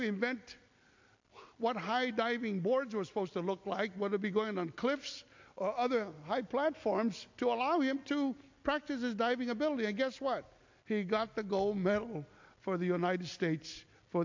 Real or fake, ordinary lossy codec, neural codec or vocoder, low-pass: real; MP3, 48 kbps; none; 7.2 kHz